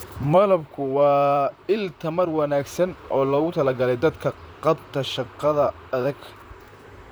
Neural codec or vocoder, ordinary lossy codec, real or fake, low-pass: vocoder, 44.1 kHz, 128 mel bands, Pupu-Vocoder; none; fake; none